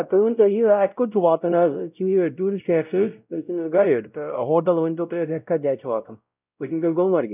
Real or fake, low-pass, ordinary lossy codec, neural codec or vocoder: fake; 3.6 kHz; none; codec, 16 kHz, 0.5 kbps, X-Codec, WavLM features, trained on Multilingual LibriSpeech